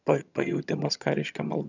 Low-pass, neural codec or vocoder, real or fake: 7.2 kHz; vocoder, 22.05 kHz, 80 mel bands, HiFi-GAN; fake